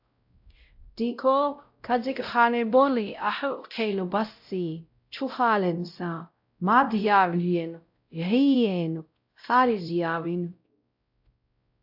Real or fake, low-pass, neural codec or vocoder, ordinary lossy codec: fake; 5.4 kHz; codec, 16 kHz, 0.5 kbps, X-Codec, WavLM features, trained on Multilingual LibriSpeech; AAC, 48 kbps